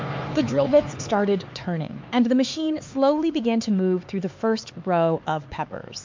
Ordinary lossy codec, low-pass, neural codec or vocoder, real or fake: MP3, 48 kbps; 7.2 kHz; codec, 16 kHz, 4 kbps, X-Codec, HuBERT features, trained on LibriSpeech; fake